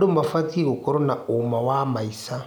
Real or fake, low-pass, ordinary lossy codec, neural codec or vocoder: real; none; none; none